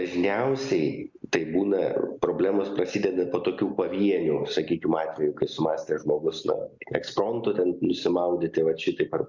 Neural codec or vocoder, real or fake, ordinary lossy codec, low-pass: none; real; Opus, 64 kbps; 7.2 kHz